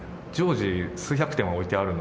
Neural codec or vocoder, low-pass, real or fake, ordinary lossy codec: none; none; real; none